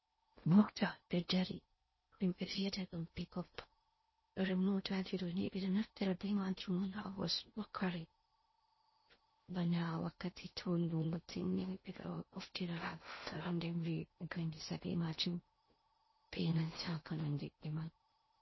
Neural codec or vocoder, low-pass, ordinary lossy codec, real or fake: codec, 16 kHz in and 24 kHz out, 0.6 kbps, FocalCodec, streaming, 4096 codes; 7.2 kHz; MP3, 24 kbps; fake